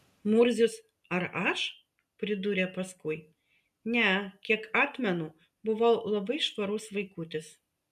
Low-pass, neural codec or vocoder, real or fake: 14.4 kHz; none; real